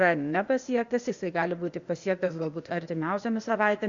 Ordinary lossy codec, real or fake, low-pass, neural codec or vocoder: Opus, 64 kbps; fake; 7.2 kHz; codec, 16 kHz, 0.8 kbps, ZipCodec